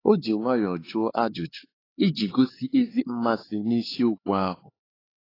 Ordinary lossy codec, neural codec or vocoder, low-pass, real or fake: AAC, 24 kbps; codec, 16 kHz, 4 kbps, X-Codec, HuBERT features, trained on LibriSpeech; 5.4 kHz; fake